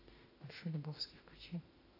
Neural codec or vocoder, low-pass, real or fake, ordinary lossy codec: autoencoder, 48 kHz, 32 numbers a frame, DAC-VAE, trained on Japanese speech; 5.4 kHz; fake; AAC, 24 kbps